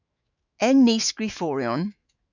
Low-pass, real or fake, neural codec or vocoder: 7.2 kHz; fake; autoencoder, 48 kHz, 128 numbers a frame, DAC-VAE, trained on Japanese speech